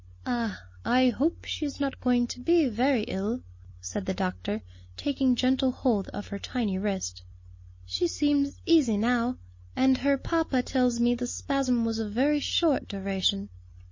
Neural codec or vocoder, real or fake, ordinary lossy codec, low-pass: none; real; MP3, 32 kbps; 7.2 kHz